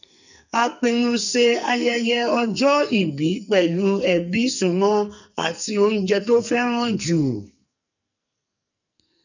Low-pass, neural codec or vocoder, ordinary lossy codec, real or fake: 7.2 kHz; codec, 44.1 kHz, 2.6 kbps, SNAC; none; fake